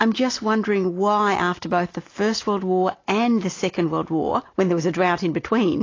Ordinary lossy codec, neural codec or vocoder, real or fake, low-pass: AAC, 32 kbps; none; real; 7.2 kHz